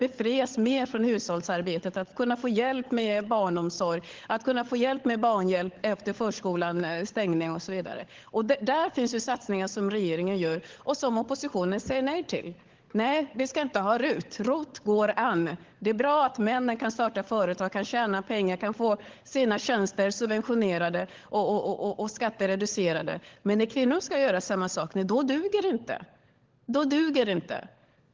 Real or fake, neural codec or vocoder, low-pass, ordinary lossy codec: fake; codec, 16 kHz, 16 kbps, FunCodec, trained on LibriTTS, 50 frames a second; 7.2 kHz; Opus, 16 kbps